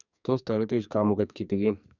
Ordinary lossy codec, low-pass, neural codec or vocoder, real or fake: none; 7.2 kHz; codec, 44.1 kHz, 2.6 kbps, SNAC; fake